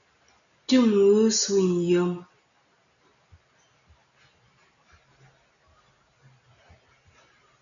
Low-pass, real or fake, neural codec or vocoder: 7.2 kHz; real; none